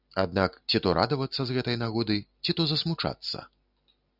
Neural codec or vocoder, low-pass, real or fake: none; 5.4 kHz; real